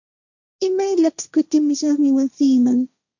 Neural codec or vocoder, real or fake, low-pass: codec, 16 kHz, 1.1 kbps, Voila-Tokenizer; fake; 7.2 kHz